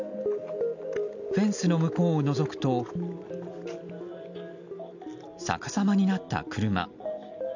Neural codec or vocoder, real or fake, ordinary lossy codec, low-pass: none; real; none; 7.2 kHz